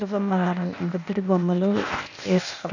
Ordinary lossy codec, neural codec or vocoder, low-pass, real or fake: none; codec, 16 kHz, 0.8 kbps, ZipCodec; 7.2 kHz; fake